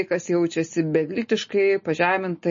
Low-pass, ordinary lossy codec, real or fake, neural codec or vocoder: 7.2 kHz; MP3, 32 kbps; real; none